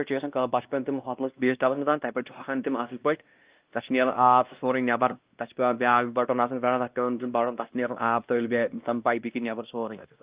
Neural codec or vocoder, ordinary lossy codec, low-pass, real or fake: codec, 16 kHz, 1 kbps, X-Codec, WavLM features, trained on Multilingual LibriSpeech; Opus, 32 kbps; 3.6 kHz; fake